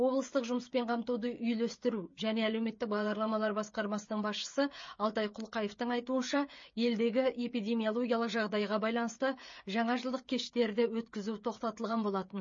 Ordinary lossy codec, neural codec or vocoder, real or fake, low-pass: MP3, 32 kbps; codec, 16 kHz, 16 kbps, FreqCodec, smaller model; fake; 7.2 kHz